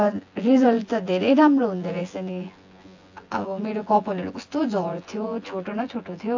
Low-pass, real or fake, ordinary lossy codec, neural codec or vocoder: 7.2 kHz; fake; AAC, 48 kbps; vocoder, 24 kHz, 100 mel bands, Vocos